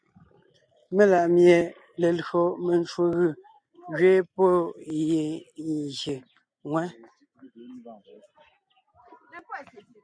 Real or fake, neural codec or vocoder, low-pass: real; none; 9.9 kHz